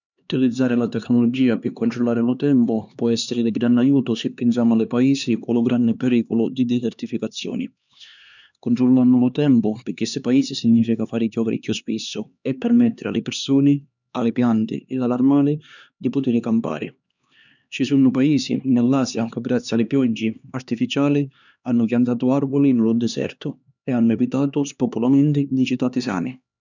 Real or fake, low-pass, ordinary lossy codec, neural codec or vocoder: fake; 7.2 kHz; none; codec, 16 kHz, 2 kbps, X-Codec, HuBERT features, trained on LibriSpeech